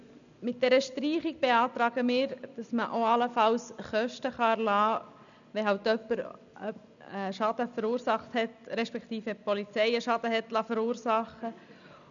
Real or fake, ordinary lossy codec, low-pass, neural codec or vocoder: real; none; 7.2 kHz; none